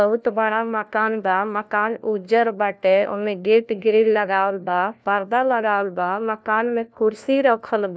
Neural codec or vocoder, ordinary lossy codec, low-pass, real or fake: codec, 16 kHz, 1 kbps, FunCodec, trained on LibriTTS, 50 frames a second; none; none; fake